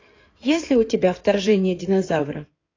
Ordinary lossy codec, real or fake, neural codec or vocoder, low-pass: AAC, 32 kbps; fake; codec, 16 kHz in and 24 kHz out, 2.2 kbps, FireRedTTS-2 codec; 7.2 kHz